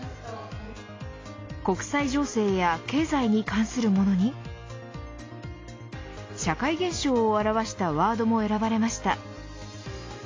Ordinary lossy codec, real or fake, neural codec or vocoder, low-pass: AAC, 32 kbps; real; none; 7.2 kHz